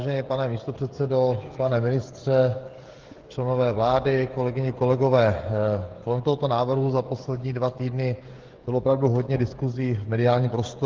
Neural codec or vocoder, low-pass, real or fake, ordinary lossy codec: codec, 16 kHz, 16 kbps, FreqCodec, smaller model; 7.2 kHz; fake; Opus, 16 kbps